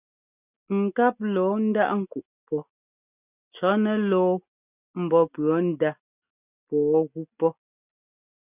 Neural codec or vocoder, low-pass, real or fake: none; 3.6 kHz; real